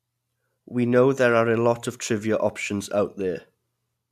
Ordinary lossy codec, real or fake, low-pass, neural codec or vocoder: AAC, 96 kbps; real; 14.4 kHz; none